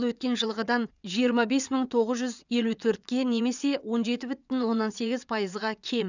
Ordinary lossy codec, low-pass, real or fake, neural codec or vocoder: none; 7.2 kHz; fake; vocoder, 22.05 kHz, 80 mel bands, WaveNeXt